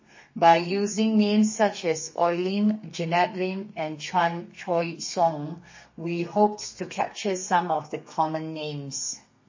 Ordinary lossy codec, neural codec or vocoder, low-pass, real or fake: MP3, 32 kbps; codec, 32 kHz, 1.9 kbps, SNAC; 7.2 kHz; fake